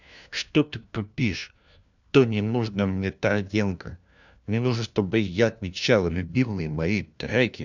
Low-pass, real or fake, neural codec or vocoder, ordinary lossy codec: 7.2 kHz; fake; codec, 16 kHz, 1 kbps, FunCodec, trained on LibriTTS, 50 frames a second; none